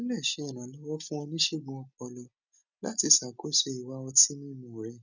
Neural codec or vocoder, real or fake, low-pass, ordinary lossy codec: none; real; 7.2 kHz; none